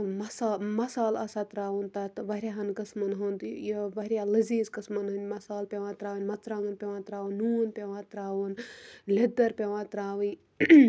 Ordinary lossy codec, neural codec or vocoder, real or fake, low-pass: none; none; real; none